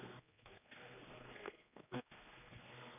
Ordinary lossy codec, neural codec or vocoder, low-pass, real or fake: none; codec, 16 kHz, 2 kbps, X-Codec, HuBERT features, trained on general audio; 3.6 kHz; fake